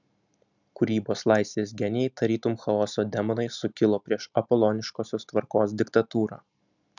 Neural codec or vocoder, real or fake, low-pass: none; real; 7.2 kHz